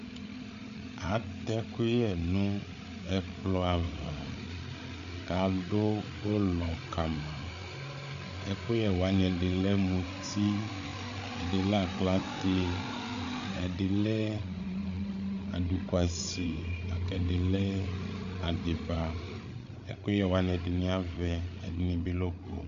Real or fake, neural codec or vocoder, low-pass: fake; codec, 16 kHz, 8 kbps, FreqCodec, larger model; 7.2 kHz